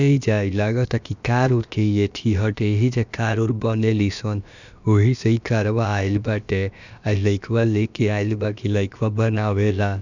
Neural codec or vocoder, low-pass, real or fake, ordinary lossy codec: codec, 16 kHz, about 1 kbps, DyCAST, with the encoder's durations; 7.2 kHz; fake; none